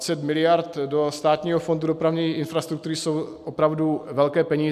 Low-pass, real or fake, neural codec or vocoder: 14.4 kHz; real; none